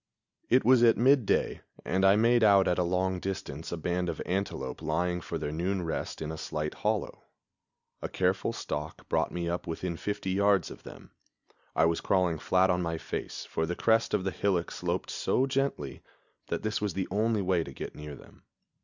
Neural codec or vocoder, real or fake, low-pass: none; real; 7.2 kHz